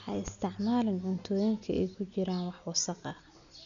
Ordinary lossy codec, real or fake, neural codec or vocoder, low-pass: none; real; none; 7.2 kHz